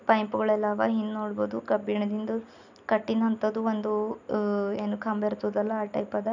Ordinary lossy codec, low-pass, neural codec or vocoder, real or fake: none; 7.2 kHz; none; real